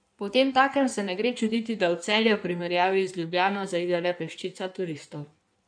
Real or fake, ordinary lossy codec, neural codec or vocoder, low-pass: fake; none; codec, 16 kHz in and 24 kHz out, 1.1 kbps, FireRedTTS-2 codec; 9.9 kHz